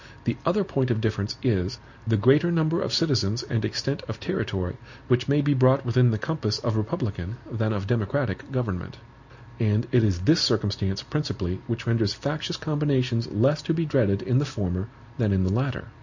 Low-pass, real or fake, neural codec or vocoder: 7.2 kHz; real; none